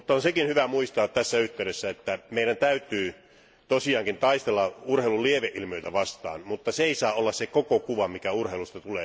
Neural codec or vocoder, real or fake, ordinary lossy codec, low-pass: none; real; none; none